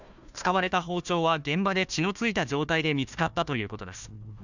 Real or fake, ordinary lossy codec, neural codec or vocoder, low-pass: fake; none; codec, 16 kHz, 1 kbps, FunCodec, trained on Chinese and English, 50 frames a second; 7.2 kHz